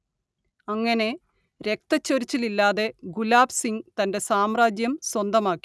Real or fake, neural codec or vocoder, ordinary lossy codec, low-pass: real; none; none; none